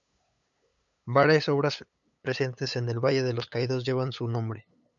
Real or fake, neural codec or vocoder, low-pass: fake; codec, 16 kHz, 8 kbps, FunCodec, trained on LibriTTS, 25 frames a second; 7.2 kHz